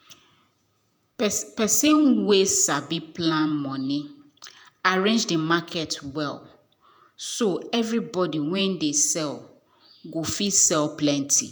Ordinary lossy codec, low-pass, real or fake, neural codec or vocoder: none; none; fake; vocoder, 48 kHz, 128 mel bands, Vocos